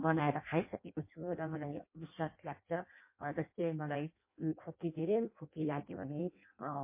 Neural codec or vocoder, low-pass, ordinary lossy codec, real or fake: codec, 16 kHz in and 24 kHz out, 0.6 kbps, FireRedTTS-2 codec; 3.6 kHz; MP3, 24 kbps; fake